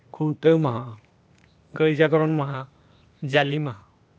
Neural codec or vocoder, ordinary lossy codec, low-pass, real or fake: codec, 16 kHz, 0.8 kbps, ZipCodec; none; none; fake